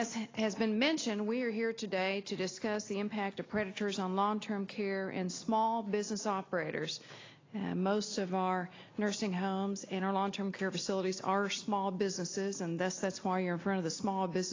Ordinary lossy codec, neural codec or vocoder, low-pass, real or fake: AAC, 32 kbps; none; 7.2 kHz; real